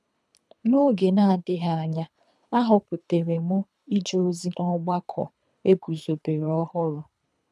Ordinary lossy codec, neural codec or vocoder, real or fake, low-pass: none; codec, 24 kHz, 3 kbps, HILCodec; fake; none